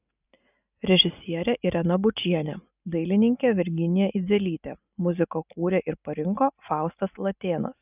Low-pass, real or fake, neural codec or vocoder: 3.6 kHz; real; none